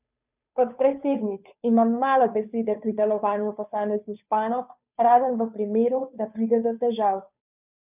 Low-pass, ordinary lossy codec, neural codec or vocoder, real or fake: 3.6 kHz; none; codec, 16 kHz, 2 kbps, FunCodec, trained on Chinese and English, 25 frames a second; fake